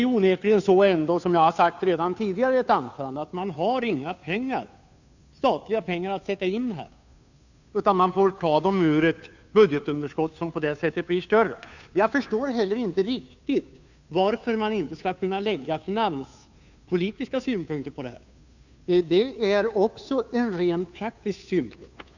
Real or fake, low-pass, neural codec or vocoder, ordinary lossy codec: fake; 7.2 kHz; codec, 16 kHz, 2 kbps, FunCodec, trained on Chinese and English, 25 frames a second; none